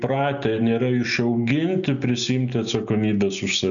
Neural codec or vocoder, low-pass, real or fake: none; 7.2 kHz; real